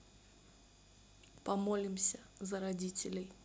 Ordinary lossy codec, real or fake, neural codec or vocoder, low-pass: none; real; none; none